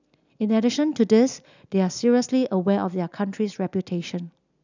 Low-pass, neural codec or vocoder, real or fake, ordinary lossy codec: 7.2 kHz; none; real; none